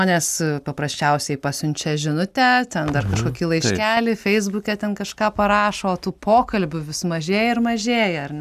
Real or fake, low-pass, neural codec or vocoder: real; 14.4 kHz; none